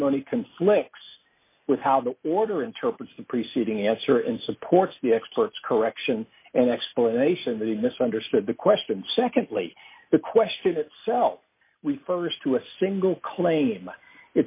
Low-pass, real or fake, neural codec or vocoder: 3.6 kHz; real; none